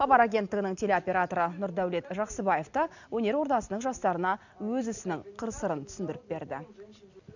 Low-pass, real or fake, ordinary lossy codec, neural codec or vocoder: 7.2 kHz; real; none; none